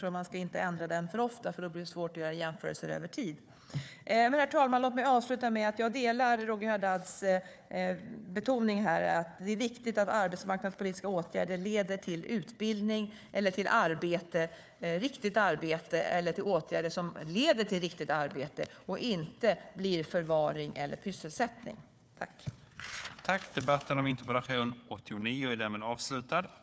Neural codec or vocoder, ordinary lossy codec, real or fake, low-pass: codec, 16 kHz, 4 kbps, FunCodec, trained on Chinese and English, 50 frames a second; none; fake; none